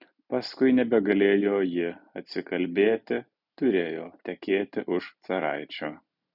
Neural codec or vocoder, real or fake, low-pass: none; real; 5.4 kHz